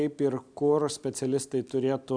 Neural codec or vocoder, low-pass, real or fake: none; 9.9 kHz; real